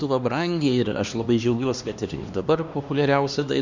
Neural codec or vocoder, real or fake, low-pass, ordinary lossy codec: codec, 16 kHz, 2 kbps, X-Codec, HuBERT features, trained on LibriSpeech; fake; 7.2 kHz; Opus, 64 kbps